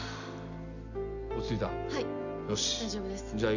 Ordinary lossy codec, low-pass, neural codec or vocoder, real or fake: none; 7.2 kHz; none; real